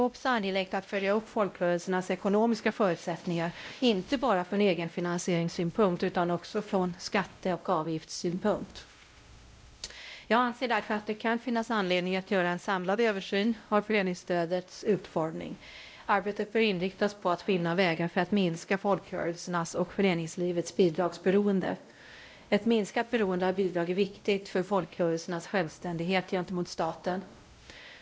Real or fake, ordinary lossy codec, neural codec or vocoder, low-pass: fake; none; codec, 16 kHz, 0.5 kbps, X-Codec, WavLM features, trained on Multilingual LibriSpeech; none